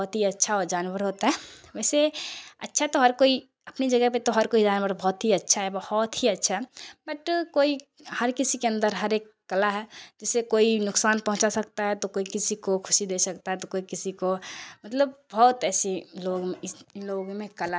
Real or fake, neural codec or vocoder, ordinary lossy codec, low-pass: real; none; none; none